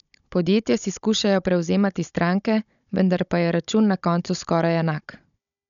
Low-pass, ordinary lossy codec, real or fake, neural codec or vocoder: 7.2 kHz; none; fake; codec, 16 kHz, 16 kbps, FunCodec, trained on Chinese and English, 50 frames a second